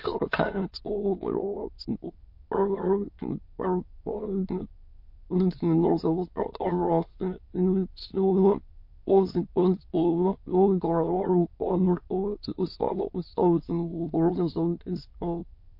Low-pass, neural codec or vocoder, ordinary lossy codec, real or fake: 5.4 kHz; autoencoder, 22.05 kHz, a latent of 192 numbers a frame, VITS, trained on many speakers; MP3, 32 kbps; fake